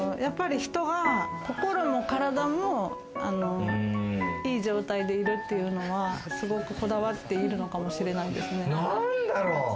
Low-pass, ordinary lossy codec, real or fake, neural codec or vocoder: none; none; real; none